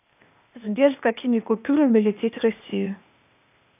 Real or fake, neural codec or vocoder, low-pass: fake; codec, 16 kHz, 0.8 kbps, ZipCodec; 3.6 kHz